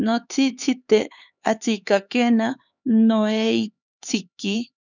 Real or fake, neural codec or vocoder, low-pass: fake; codec, 16 kHz, 4 kbps, X-Codec, HuBERT features, trained on LibriSpeech; 7.2 kHz